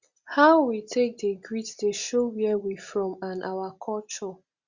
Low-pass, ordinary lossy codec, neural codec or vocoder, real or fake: 7.2 kHz; Opus, 64 kbps; none; real